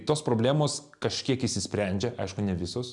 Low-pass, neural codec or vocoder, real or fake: 10.8 kHz; none; real